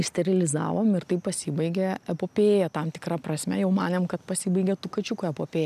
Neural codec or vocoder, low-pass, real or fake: none; 14.4 kHz; real